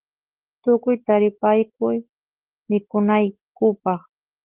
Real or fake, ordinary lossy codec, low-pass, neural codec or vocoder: real; Opus, 16 kbps; 3.6 kHz; none